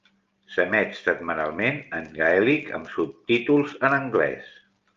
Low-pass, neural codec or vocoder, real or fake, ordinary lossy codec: 7.2 kHz; none; real; Opus, 32 kbps